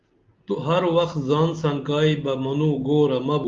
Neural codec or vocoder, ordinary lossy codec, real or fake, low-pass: none; Opus, 32 kbps; real; 7.2 kHz